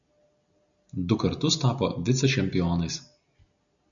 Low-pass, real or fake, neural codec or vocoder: 7.2 kHz; real; none